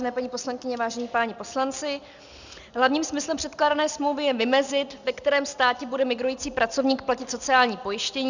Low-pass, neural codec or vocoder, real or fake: 7.2 kHz; none; real